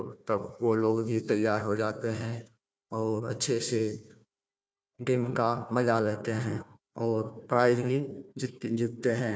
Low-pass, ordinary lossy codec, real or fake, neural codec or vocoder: none; none; fake; codec, 16 kHz, 1 kbps, FunCodec, trained on Chinese and English, 50 frames a second